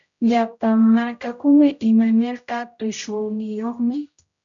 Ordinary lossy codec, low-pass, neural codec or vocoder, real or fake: MP3, 96 kbps; 7.2 kHz; codec, 16 kHz, 0.5 kbps, X-Codec, HuBERT features, trained on general audio; fake